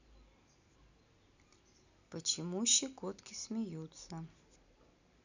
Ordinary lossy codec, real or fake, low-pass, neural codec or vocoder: none; real; 7.2 kHz; none